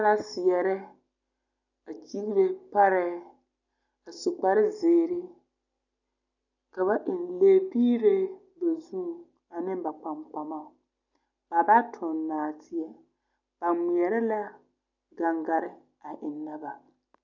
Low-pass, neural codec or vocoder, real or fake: 7.2 kHz; none; real